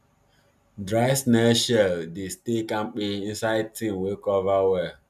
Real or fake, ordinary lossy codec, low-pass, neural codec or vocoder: real; none; 14.4 kHz; none